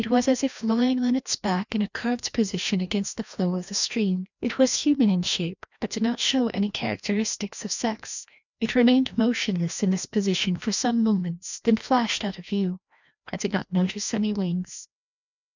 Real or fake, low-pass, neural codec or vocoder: fake; 7.2 kHz; codec, 16 kHz, 1 kbps, FreqCodec, larger model